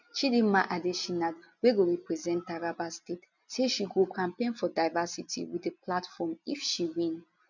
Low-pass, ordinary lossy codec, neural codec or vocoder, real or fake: 7.2 kHz; none; none; real